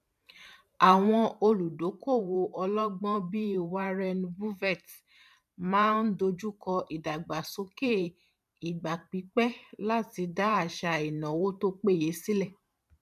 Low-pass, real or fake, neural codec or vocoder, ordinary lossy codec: 14.4 kHz; fake; vocoder, 44.1 kHz, 128 mel bands every 512 samples, BigVGAN v2; none